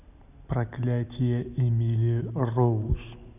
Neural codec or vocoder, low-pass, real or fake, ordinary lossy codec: none; 3.6 kHz; real; none